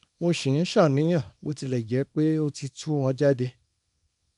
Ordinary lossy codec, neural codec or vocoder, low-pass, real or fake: none; codec, 24 kHz, 0.9 kbps, WavTokenizer, small release; 10.8 kHz; fake